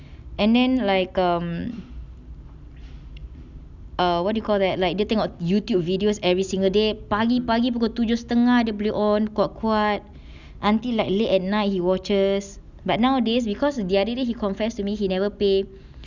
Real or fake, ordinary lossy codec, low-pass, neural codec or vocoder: real; none; 7.2 kHz; none